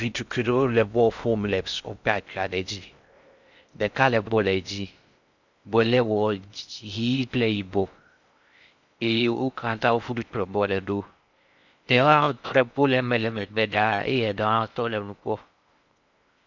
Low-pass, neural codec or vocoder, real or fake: 7.2 kHz; codec, 16 kHz in and 24 kHz out, 0.6 kbps, FocalCodec, streaming, 2048 codes; fake